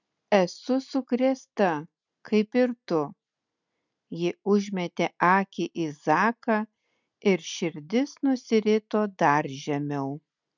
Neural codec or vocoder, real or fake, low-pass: none; real; 7.2 kHz